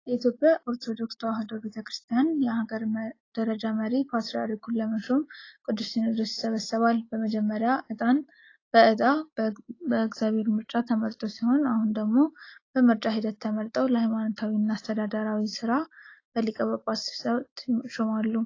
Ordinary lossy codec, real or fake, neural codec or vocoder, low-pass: AAC, 32 kbps; real; none; 7.2 kHz